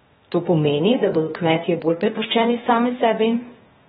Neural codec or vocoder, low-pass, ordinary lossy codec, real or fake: codec, 16 kHz, 0.8 kbps, ZipCodec; 7.2 kHz; AAC, 16 kbps; fake